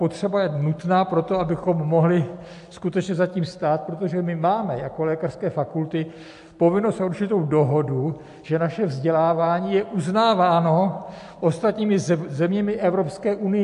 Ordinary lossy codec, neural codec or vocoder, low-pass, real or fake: AAC, 64 kbps; none; 10.8 kHz; real